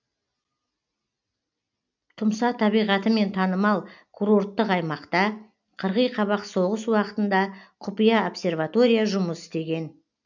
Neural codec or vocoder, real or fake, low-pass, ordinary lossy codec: none; real; 7.2 kHz; none